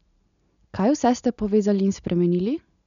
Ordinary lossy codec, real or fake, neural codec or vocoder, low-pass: none; real; none; 7.2 kHz